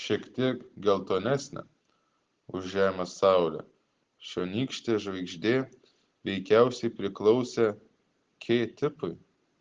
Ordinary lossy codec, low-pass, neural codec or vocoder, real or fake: Opus, 16 kbps; 7.2 kHz; none; real